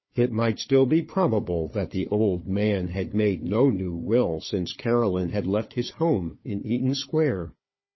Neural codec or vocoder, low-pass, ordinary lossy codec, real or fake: codec, 16 kHz, 4 kbps, FunCodec, trained on Chinese and English, 50 frames a second; 7.2 kHz; MP3, 24 kbps; fake